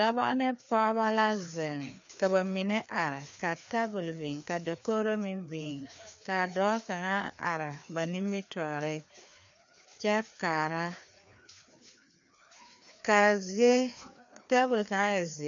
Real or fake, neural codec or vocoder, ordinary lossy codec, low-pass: fake; codec, 16 kHz, 2 kbps, FreqCodec, larger model; MP3, 64 kbps; 7.2 kHz